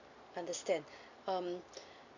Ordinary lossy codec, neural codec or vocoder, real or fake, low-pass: none; none; real; 7.2 kHz